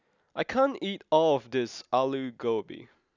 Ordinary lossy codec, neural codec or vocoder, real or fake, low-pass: none; none; real; 7.2 kHz